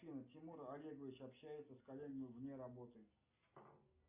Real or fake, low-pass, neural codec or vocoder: real; 3.6 kHz; none